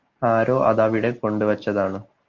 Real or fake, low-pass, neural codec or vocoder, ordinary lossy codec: real; 7.2 kHz; none; Opus, 24 kbps